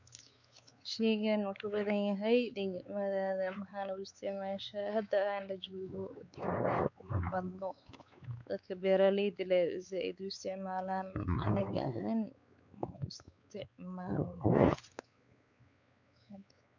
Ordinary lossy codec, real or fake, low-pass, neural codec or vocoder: none; fake; 7.2 kHz; codec, 16 kHz, 4 kbps, X-Codec, WavLM features, trained on Multilingual LibriSpeech